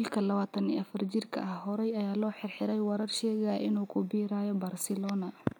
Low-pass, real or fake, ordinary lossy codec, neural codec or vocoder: none; real; none; none